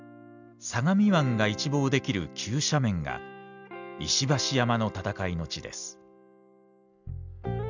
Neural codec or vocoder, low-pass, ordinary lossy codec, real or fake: none; 7.2 kHz; none; real